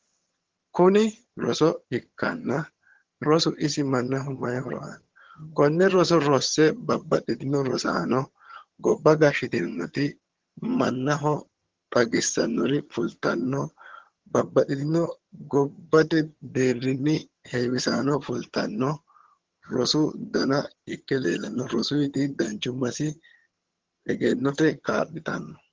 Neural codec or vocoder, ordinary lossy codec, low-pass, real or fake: vocoder, 22.05 kHz, 80 mel bands, HiFi-GAN; Opus, 16 kbps; 7.2 kHz; fake